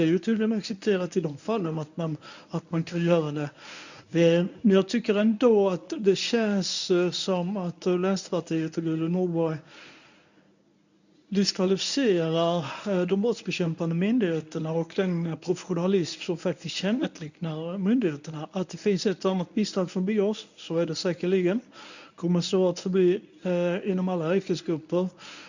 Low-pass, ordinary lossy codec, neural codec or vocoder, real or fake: 7.2 kHz; none; codec, 24 kHz, 0.9 kbps, WavTokenizer, medium speech release version 1; fake